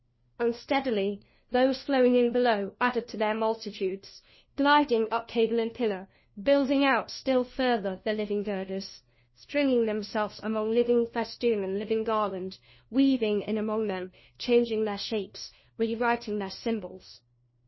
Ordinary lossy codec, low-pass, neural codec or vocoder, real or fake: MP3, 24 kbps; 7.2 kHz; codec, 16 kHz, 1 kbps, FunCodec, trained on LibriTTS, 50 frames a second; fake